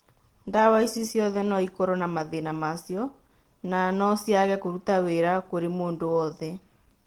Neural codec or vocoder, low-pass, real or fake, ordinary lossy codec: none; 19.8 kHz; real; Opus, 16 kbps